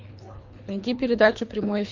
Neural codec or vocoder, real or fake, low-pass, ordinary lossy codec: codec, 24 kHz, 3 kbps, HILCodec; fake; 7.2 kHz; MP3, 48 kbps